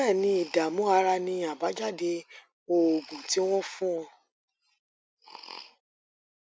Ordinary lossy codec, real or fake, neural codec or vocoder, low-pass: none; real; none; none